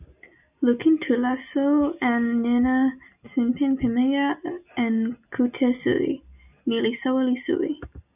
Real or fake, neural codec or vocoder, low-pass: real; none; 3.6 kHz